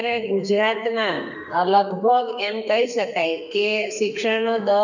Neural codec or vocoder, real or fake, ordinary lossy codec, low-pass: codec, 32 kHz, 1.9 kbps, SNAC; fake; none; 7.2 kHz